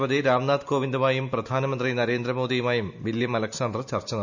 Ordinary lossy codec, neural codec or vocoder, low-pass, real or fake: none; none; 7.2 kHz; real